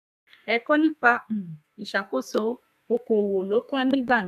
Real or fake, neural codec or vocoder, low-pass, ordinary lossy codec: fake; codec, 32 kHz, 1.9 kbps, SNAC; 14.4 kHz; none